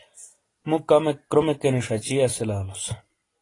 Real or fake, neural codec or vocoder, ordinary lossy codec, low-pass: real; none; AAC, 32 kbps; 10.8 kHz